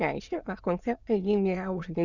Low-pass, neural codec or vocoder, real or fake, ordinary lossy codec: 7.2 kHz; autoencoder, 22.05 kHz, a latent of 192 numbers a frame, VITS, trained on many speakers; fake; Opus, 64 kbps